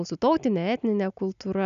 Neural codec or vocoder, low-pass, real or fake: none; 7.2 kHz; real